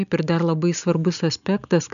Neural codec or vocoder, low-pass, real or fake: none; 7.2 kHz; real